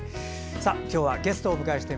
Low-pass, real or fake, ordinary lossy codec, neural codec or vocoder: none; real; none; none